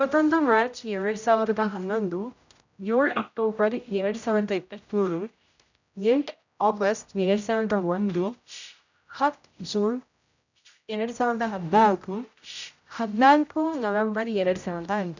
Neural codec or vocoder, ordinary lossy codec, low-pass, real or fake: codec, 16 kHz, 0.5 kbps, X-Codec, HuBERT features, trained on general audio; none; 7.2 kHz; fake